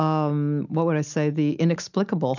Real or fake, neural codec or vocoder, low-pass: real; none; 7.2 kHz